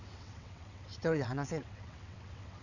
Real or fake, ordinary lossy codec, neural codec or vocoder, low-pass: fake; none; vocoder, 22.05 kHz, 80 mel bands, WaveNeXt; 7.2 kHz